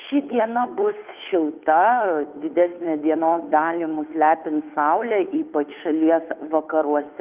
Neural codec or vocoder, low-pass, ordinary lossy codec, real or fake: codec, 16 kHz, 2 kbps, FunCodec, trained on Chinese and English, 25 frames a second; 3.6 kHz; Opus, 24 kbps; fake